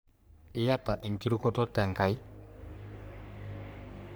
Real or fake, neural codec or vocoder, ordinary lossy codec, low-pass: fake; codec, 44.1 kHz, 3.4 kbps, Pupu-Codec; none; none